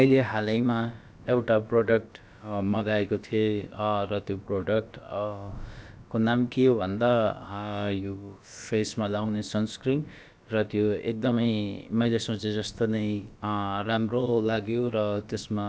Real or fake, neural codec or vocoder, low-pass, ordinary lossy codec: fake; codec, 16 kHz, about 1 kbps, DyCAST, with the encoder's durations; none; none